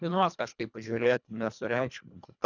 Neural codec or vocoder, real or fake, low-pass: codec, 24 kHz, 1.5 kbps, HILCodec; fake; 7.2 kHz